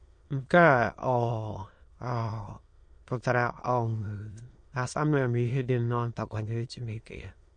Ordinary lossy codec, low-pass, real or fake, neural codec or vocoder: MP3, 48 kbps; 9.9 kHz; fake; autoencoder, 22.05 kHz, a latent of 192 numbers a frame, VITS, trained on many speakers